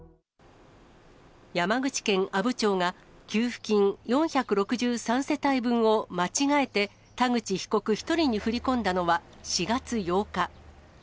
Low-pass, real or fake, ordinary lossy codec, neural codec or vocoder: none; real; none; none